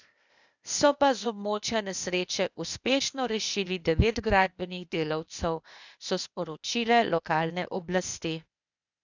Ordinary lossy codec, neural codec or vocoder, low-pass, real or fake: none; codec, 16 kHz, 0.8 kbps, ZipCodec; 7.2 kHz; fake